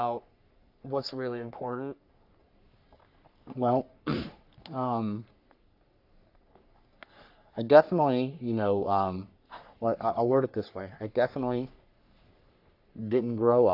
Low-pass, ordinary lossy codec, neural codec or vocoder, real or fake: 5.4 kHz; AAC, 48 kbps; codec, 44.1 kHz, 3.4 kbps, Pupu-Codec; fake